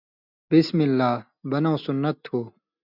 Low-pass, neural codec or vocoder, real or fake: 5.4 kHz; none; real